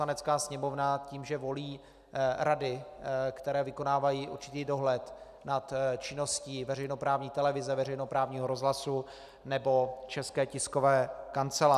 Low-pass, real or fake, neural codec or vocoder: 14.4 kHz; real; none